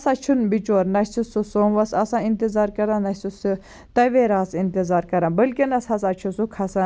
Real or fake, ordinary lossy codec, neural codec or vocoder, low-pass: real; none; none; none